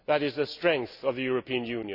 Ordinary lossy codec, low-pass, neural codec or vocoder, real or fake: none; 5.4 kHz; none; real